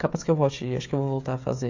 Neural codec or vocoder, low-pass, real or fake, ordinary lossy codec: codec, 16 kHz, 16 kbps, FreqCodec, smaller model; 7.2 kHz; fake; AAC, 48 kbps